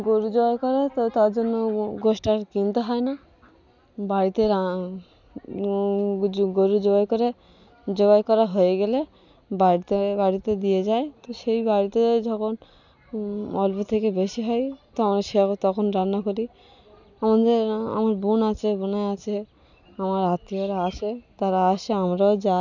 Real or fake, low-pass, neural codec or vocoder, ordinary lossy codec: real; 7.2 kHz; none; none